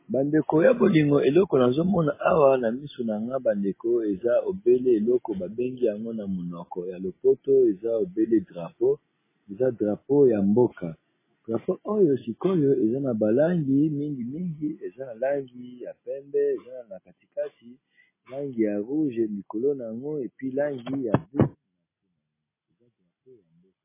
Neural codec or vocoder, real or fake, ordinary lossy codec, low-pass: none; real; MP3, 16 kbps; 3.6 kHz